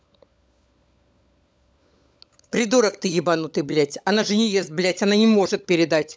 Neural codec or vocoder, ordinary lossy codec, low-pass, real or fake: codec, 16 kHz, 16 kbps, FunCodec, trained on LibriTTS, 50 frames a second; none; none; fake